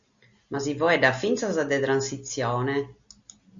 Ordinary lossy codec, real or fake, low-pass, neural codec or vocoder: Opus, 64 kbps; real; 7.2 kHz; none